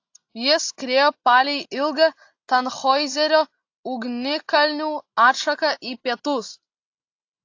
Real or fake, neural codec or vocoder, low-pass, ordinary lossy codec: real; none; 7.2 kHz; AAC, 48 kbps